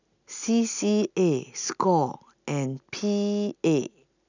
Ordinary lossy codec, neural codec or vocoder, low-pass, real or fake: none; none; 7.2 kHz; real